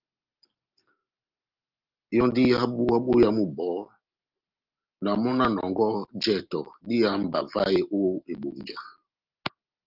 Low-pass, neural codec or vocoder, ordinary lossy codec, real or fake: 5.4 kHz; none; Opus, 32 kbps; real